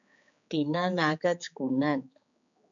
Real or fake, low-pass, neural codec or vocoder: fake; 7.2 kHz; codec, 16 kHz, 4 kbps, X-Codec, HuBERT features, trained on general audio